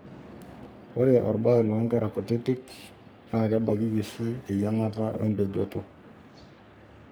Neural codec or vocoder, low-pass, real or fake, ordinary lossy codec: codec, 44.1 kHz, 3.4 kbps, Pupu-Codec; none; fake; none